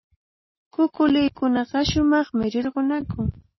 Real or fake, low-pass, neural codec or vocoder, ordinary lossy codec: real; 7.2 kHz; none; MP3, 24 kbps